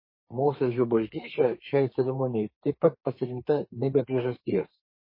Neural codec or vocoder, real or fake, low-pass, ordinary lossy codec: codec, 32 kHz, 1.9 kbps, SNAC; fake; 5.4 kHz; MP3, 24 kbps